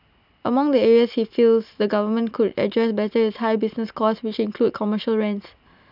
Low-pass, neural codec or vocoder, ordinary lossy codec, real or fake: 5.4 kHz; none; none; real